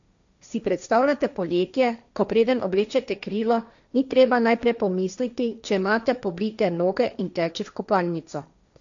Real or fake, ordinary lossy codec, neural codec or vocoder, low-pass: fake; none; codec, 16 kHz, 1.1 kbps, Voila-Tokenizer; 7.2 kHz